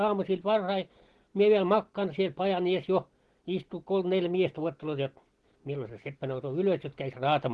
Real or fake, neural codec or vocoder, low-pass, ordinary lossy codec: real; none; 10.8 kHz; Opus, 16 kbps